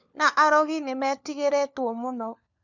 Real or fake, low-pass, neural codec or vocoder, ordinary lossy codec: fake; 7.2 kHz; codec, 16 kHz, 4 kbps, FunCodec, trained on LibriTTS, 50 frames a second; none